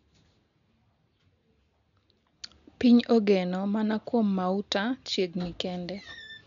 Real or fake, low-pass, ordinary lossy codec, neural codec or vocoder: real; 7.2 kHz; none; none